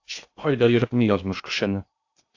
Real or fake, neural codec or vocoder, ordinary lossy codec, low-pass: fake; codec, 16 kHz in and 24 kHz out, 0.8 kbps, FocalCodec, streaming, 65536 codes; AAC, 48 kbps; 7.2 kHz